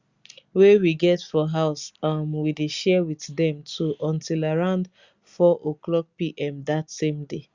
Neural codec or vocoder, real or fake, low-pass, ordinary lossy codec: none; real; 7.2 kHz; Opus, 64 kbps